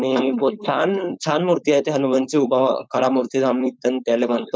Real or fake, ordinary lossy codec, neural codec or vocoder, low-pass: fake; none; codec, 16 kHz, 4.8 kbps, FACodec; none